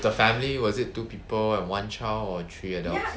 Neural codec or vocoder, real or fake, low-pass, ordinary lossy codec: none; real; none; none